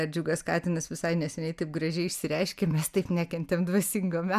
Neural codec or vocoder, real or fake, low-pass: none; real; 14.4 kHz